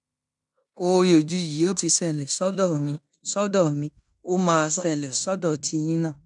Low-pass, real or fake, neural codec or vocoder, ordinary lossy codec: 10.8 kHz; fake; codec, 16 kHz in and 24 kHz out, 0.9 kbps, LongCat-Audio-Codec, fine tuned four codebook decoder; none